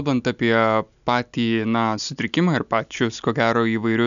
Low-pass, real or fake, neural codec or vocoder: 7.2 kHz; real; none